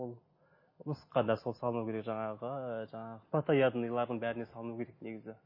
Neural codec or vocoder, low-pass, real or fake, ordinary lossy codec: none; 5.4 kHz; real; MP3, 24 kbps